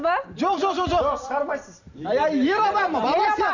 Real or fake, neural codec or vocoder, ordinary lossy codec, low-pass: fake; vocoder, 44.1 kHz, 128 mel bands every 512 samples, BigVGAN v2; none; 7.2 kHz